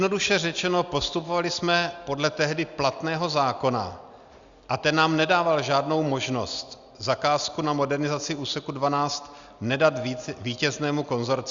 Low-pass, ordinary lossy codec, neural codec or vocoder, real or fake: 7.2 kHz; Opus, 64 kbps; none; real